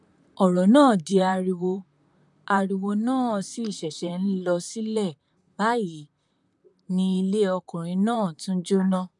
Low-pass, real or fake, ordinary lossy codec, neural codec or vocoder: 10.8 kHz; fake; none; vocoder, 44.1 kHz, 128 mel bands, Pupu-Vocoder